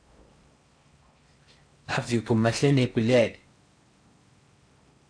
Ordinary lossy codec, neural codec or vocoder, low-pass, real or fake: MP3, 64 kbps; codec, 16 kHz in and 24 kHz out, 0.6 kbps, FocalCodec, streaming, 4096 codes; 9.9 kHz; fake